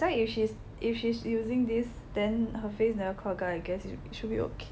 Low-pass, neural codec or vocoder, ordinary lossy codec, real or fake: none; none; none; real